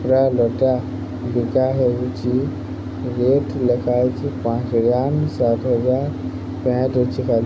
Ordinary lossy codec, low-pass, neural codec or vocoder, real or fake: none; none; none; real